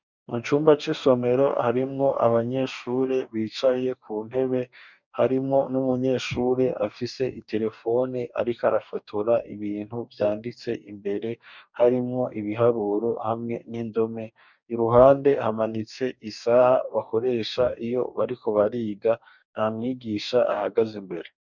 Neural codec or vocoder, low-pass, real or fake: codec, 44.1 kHz, 2.6 kbps, DAC; 7.2 kHz; fake